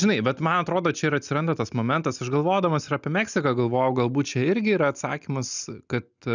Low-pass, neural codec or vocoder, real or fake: 7.2 kHz; none; real